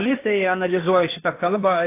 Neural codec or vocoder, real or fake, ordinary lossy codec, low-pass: codec, 16 kHz in and 24 kHz out, 0.8 kbps, FocalCodec, streaming, 65536 codes; fake; AAC, 24 kbps; 3.6 kHz